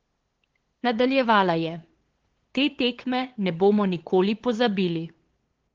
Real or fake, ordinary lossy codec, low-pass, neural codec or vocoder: fake; Opus, 16 kbps; 7.2 kHz; codec, 16 kHz, 8 kbps, FunCodec, trained on LibriTTS, 25 frames a second